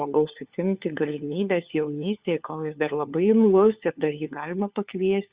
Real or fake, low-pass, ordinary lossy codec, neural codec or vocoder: fake; 3.6 kHz; Opus, 64 kbps; codec, 16 kHz, 4 kbps, FunCodec, trained on LibriTTS, 50 frames a second